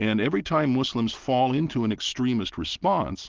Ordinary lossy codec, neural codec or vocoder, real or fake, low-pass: Opus, 16 kbps; none; real; 7.2 kHz